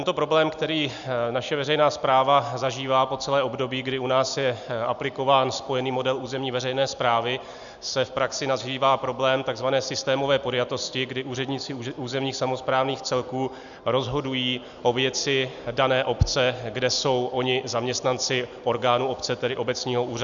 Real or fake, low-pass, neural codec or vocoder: real; 7.2 kHz; none